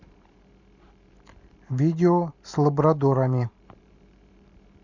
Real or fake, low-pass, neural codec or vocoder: fake; 7.2 kHz; vocoder, 44.1 kHz, 128 mel bands every 512 samples, BigVGAN v2